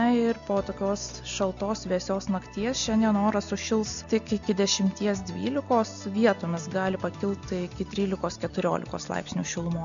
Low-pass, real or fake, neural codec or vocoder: 7.2 kHz; real; none